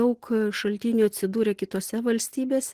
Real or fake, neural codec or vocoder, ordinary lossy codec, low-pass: real; none; Opus, 16 kbps; 14.4 kHz